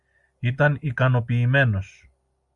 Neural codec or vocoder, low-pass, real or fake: none; 10.8 kHz; real